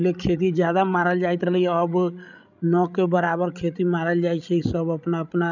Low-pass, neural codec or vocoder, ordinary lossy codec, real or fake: 7.2 kHz; codec, 16 kHz, 16 kbps, FreqCodec, larger model; none; fake